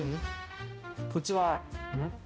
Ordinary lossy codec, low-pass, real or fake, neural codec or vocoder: none; none; fake; codec, 16 kHz, 0.5 kbps, X-Codec, HuBERT features, trained on balanced general audio